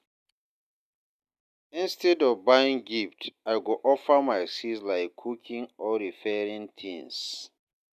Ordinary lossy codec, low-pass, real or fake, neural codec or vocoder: none; 14.4 kHz; real; none